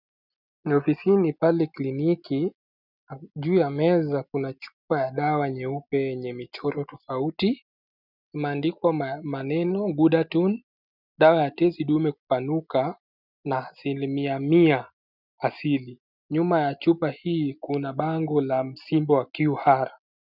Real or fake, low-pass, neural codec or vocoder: real; 5.4 kHz; none